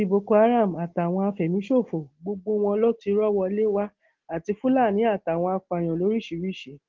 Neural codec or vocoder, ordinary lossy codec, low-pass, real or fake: none; Opus, 16 kbps; 7.2 kHz; real